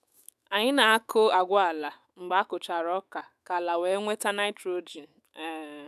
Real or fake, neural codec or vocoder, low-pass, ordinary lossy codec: fake; autoencoder, 48 kHz, 128 numbers a frame, DAC-VAE, trained on Japanese speech; 14.4 kHz; none